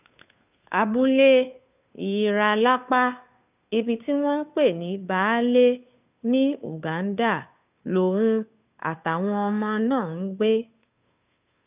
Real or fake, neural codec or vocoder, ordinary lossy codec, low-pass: fake; codec, 16 kHz, 2 kbps, FunCodec, trained on Chinese and English, 25 frames a second; none; 3.6 kHz